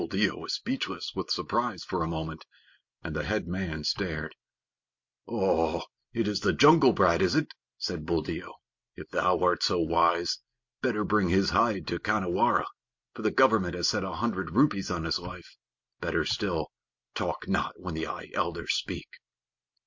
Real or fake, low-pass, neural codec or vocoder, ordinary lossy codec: real; 7.2 kHz; none; MP3, 48 kbps